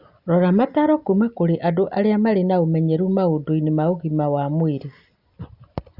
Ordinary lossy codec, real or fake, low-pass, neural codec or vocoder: Opus, 64 kbps; real; 5.4 kHz; none